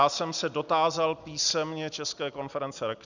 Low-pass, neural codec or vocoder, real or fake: 7.2 kHz; none; real